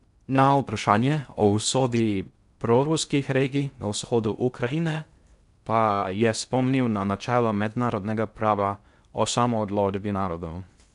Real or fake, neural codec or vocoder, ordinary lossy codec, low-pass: fake; codec, 16 kHz in and 24 kHz out, 0.6 kbps, FocalCodec, streaming, 4096 codes; none; 10.8 kHz